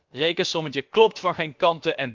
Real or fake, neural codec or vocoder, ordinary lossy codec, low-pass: fake; codec, 16 kHz, about 1 kbps, DyCAST, with the encoder's durations; Opus, 24 kbps; 7.2 kHz